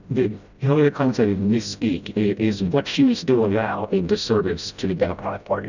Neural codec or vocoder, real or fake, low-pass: codec, 16 kHz, 0.5 kbps, FreqCodec, smaller model; fake; 7.2 kHz